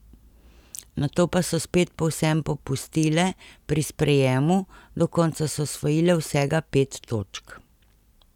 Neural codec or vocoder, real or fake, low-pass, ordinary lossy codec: none; real; 19.8 kHz; none